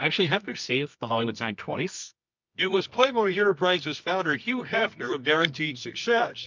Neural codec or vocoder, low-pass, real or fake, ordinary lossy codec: codec, 24 kHz, 0.9 kbps, WavTokenizer, medium music audio release; 7.2 kHz; fake; MP3, 64 kbps